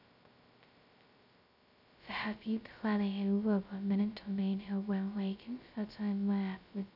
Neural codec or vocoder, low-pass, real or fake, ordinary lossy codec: codec, 16 kHz, 0.2 kbps, FocalCodec; 5.4 kHz; fake; none